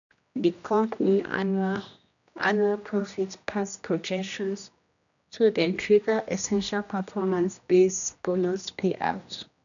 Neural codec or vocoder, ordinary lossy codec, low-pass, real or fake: codec, 16 kHz, 1 kbps, X-Codec, HuBERT features, trained on general audio; none; 7.2 kHz; fake